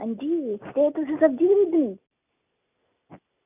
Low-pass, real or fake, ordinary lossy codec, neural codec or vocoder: 3.6 kHz; real; none; none